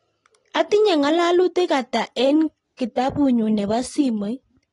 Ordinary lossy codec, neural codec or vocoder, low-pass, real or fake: AAC, 32 kbps; none; 10.8 kHz; real